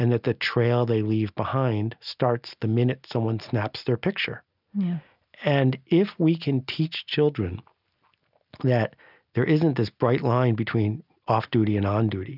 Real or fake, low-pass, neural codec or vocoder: real; 5.4 kHz; none